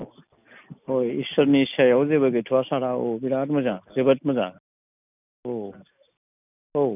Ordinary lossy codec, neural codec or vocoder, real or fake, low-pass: none; none; real; 3.6 kHz